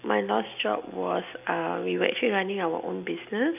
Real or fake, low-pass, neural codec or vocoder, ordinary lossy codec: real; 3.6 kHz; none; none